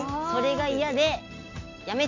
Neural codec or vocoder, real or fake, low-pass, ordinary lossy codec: none; real; 7.2 kHz; MP3, 64 kbps